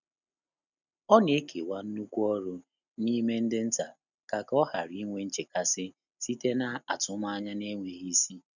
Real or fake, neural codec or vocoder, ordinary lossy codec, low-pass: real; none; none; 7.2 kHz